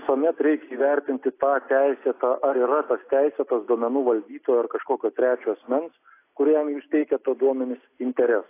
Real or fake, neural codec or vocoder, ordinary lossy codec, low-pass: real; none; AAC, 24 kbps; 3.6 kHz